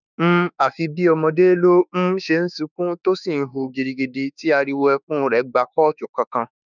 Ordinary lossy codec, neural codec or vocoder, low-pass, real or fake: none; autoencoder, 48 kHz, 32 numbers a frame, DAC-VAE, trained on Japanese speech; 7.2 kHz; fake